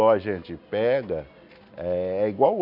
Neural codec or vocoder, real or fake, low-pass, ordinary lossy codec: none; real; 5.4 kHz; none